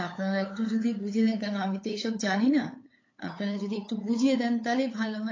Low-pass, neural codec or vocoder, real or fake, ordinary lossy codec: 7.2 kHz; codec, 16 kHz, 8 kbps, FunCodec, trained on LibriTTS, 25 frames a second; fake; AAC, 32 kbps